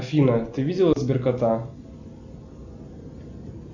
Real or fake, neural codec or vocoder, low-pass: real; none; 7.2 kHz